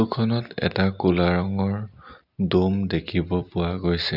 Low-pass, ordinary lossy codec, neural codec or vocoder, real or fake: 5.4 kHz; none; none; real